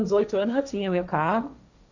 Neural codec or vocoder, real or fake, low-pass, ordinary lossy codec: codec, 16 kHz, 1.1 kbps, Voila-Tokenizer; fake; 7.2 kHz; none